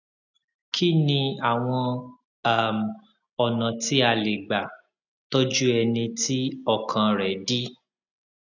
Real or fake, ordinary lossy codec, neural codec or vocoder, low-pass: real; none; none; 7.2 kHz